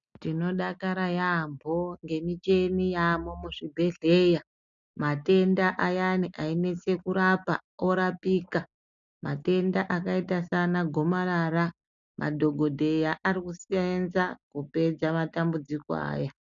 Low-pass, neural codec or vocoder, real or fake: 7.2 kHz; none; real